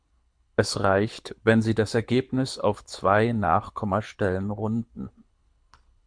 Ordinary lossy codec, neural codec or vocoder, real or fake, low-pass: MP3, 64 kbps; codec, 24 kHz, 6 kbps, HILCodec; fake; 9.9 kHz